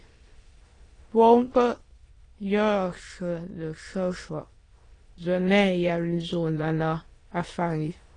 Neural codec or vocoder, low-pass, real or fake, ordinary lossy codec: autoencoder, 22.05 kHz, a latent of 192 numbers a frame, VITS, trained on many speakers; 9.9 kHz; fake; AAC, 32 kbps